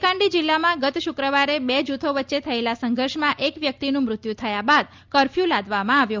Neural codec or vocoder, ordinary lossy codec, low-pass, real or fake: none; Opus, 24 kbps; 7.2 kHz; real